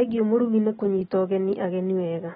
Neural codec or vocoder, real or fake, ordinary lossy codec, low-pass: none; real; AAC, 16 kbps; 10.8 kHz